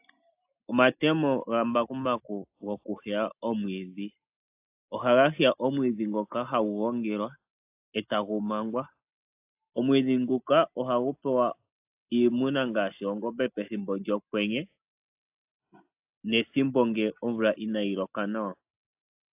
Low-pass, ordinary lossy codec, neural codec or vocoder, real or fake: 3.6 kHz; AAC, 32 kbps; none; real